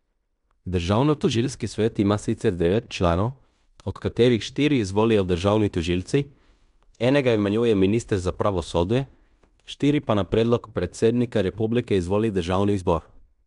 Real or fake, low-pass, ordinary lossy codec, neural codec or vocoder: fake; 10.8 kHz; none; codec, 16 kHz in and 24 kHz out, 0.9 kbps, LongCat-Audio-Codec, fine tuned four codebook decoder